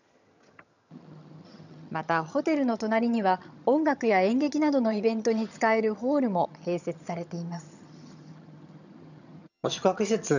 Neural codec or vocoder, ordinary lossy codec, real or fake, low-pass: vocoder, 22.05 kHz, 80 mel bands, HiFi-GAN; none; fake; 7.2 kHz